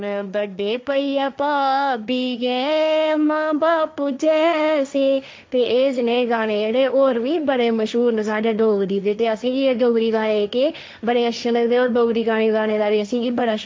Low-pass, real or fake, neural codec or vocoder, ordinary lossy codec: none; fake; codec, 16 kHz, 1.1 kbps, Voila-Tokenizer; none